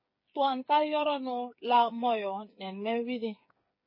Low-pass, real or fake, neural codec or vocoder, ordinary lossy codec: 5.4 kHz; fake; codec, 16 kHz, 8 kbps, FreqCodec, smaller model; MP3, 24 kbps